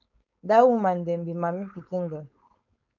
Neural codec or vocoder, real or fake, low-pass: codec, 16 kHz, 4.8 kbps, FACodec; fake; 7.2 kHz